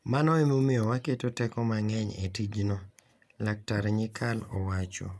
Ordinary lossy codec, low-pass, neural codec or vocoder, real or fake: none; none; none; real